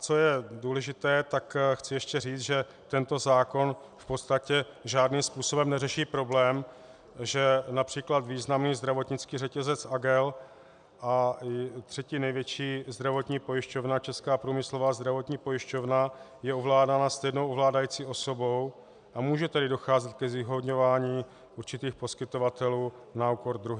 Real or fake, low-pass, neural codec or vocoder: real; 9.9 kHz; none